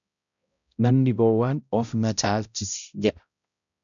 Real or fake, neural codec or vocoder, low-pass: fake; codec, 16 kHz, 0.5 kbps, X-Codec, HuBERT features, trained on balanced general audio; 7.2 kHz